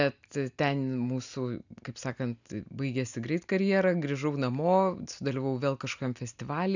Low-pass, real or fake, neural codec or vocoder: 7.2 kHz; real; none